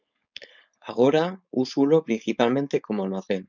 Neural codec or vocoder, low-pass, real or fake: codec, 16 kHz, 4.8 kbps, FACodec; 7.2 kHz; fake